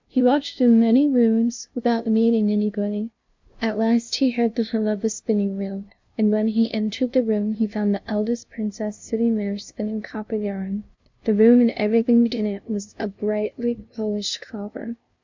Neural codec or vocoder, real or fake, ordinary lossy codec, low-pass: codec, 16 kHz, 0.5 kbps, FunCodec, trained on LibriTTS, 25 frames a second; fake; MP3, 64 kbps; 7.2 kHz